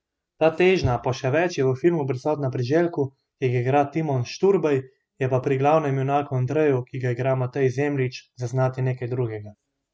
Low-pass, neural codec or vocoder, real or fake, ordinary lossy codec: none; none; real; none